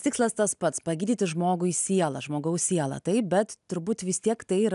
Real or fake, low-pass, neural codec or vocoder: real; 10.8 kHz; none